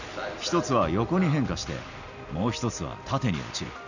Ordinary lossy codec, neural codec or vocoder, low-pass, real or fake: none; none; 7.2 kHz; real